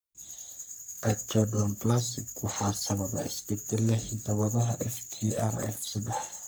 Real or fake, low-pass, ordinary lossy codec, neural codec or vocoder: fake; none; none; codec, 44.1 kHz, 3.4 kbps, Pupu-Codec